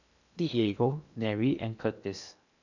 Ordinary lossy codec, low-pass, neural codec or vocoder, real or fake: none; 7.2 kHz; codec, 16 kHz in and 24 kHz out, 0.8 kbps, FocalCodec, streaming, 65536 codes; fake